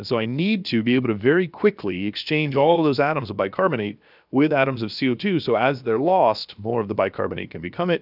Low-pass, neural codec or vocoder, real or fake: 5.4 kHz; codec, 16 kHz, about 1 kbps, DyCAST, with the encoder's durations; fake